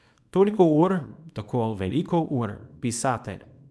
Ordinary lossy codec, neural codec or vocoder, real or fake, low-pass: none; codec, 24 kHz, 0.9 kbps, WavTokenizer, small release; fake; none